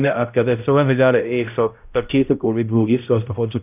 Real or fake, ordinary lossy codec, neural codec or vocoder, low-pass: fake; none; codec, 16 kHz, 0.5 kbps, X-Codec, HuBERT features, trained on balanced general audio; 3.6 kHz